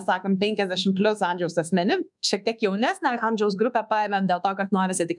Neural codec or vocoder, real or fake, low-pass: codec, 24 kHz, 1.2 kbps, DualCodec; fake; 10.8 kHz